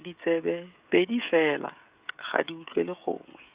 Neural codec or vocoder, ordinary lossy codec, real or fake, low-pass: codec, 16 kHz, 16 kbps, FreqCodec, smaller model; Opus, 64 kbps; fake; 3.6 kHz